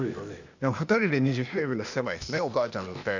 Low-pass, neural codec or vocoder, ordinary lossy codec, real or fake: 7.2 kHz; codec, 16 kHz, 0.8 kbps, ZipCodec; none; fake